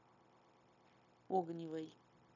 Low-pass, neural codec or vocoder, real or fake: 7.2 kHz; codec, 16 kHz, 0.9 kbps, LongCat-Audio-Codec; fake